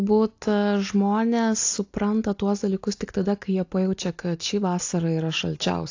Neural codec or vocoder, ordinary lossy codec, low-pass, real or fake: none; AAC, 48 kbps; 7.2 kHz; real